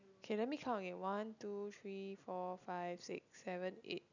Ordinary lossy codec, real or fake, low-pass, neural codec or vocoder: none; real; 7.2 kHz; none